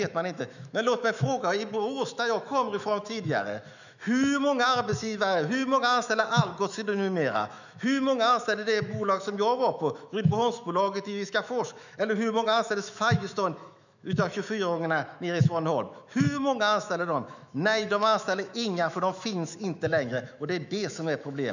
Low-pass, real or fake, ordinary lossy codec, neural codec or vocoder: 7.2 kHz; fake; none; autoencoder, 48 kHz, 128 numbers a frame, DAC-VAE, trained on Japanese speech